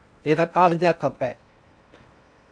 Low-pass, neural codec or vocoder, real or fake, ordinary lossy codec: 9.9 kHz; codec, 16 kHz in and 24 kHz out, 0.6 kbps, FocalCodec, streaming, 2048 codes; fake; AAC, 64 kbps